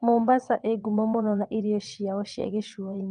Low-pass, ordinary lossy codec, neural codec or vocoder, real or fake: 9.9 kHz; Opus, 32 kbps; vocoder, 22.05 kHz, 80 mel bands, WaveNeXt; fake